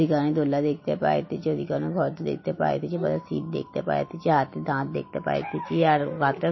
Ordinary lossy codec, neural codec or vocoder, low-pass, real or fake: MP3, 24 kbps; none; 7.2 kHz; real